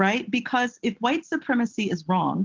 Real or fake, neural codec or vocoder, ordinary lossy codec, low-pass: real; none; Opus, 16 kbps; 7.2 kHz